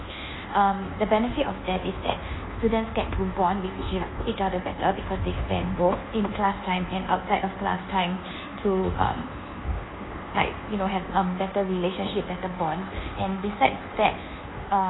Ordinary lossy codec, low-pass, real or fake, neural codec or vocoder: AAC, 16 kbps; 7.2 kHz; fake; codec, 24 kHz, 1.2 kbps, DualCodec